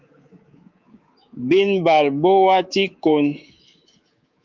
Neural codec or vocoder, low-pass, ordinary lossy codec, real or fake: codec, 24 kHz, 3.1 kbps, DualCodec; 7.2 kHz; Opus, 24 kbps; fake